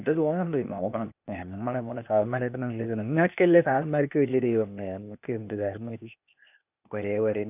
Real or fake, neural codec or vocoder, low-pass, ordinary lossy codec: fake; codec, 16 kHz, 0.8 kbps, ZipCodec; 3.6 kHz; none